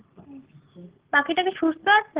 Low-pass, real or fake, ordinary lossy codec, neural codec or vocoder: 3.6 kHz; real; Opus, 16 kbps; none